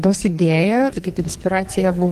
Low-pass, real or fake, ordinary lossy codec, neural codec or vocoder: 14.4 kHz; fake; Opus, 24 kbps; codec, 44.1 kHz, 2.6 kbps, SNAC